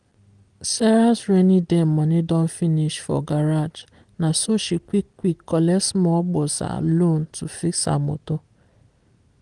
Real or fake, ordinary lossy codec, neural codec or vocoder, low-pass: real; Opus, 24 kbps; none; 10.8 kHz